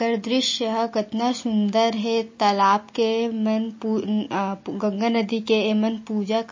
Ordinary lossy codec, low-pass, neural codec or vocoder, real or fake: MP3, 32 kbps; 7.2 kHz; none; real